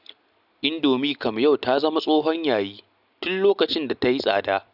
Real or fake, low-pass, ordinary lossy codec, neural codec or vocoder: real; 5.4 kHz; none; none